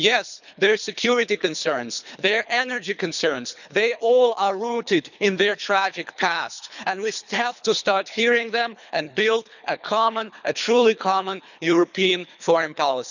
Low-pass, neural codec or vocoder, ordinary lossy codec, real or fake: 7.2 kHz; codec, 24 kHz, 3 kbps, HILCodec; none; fake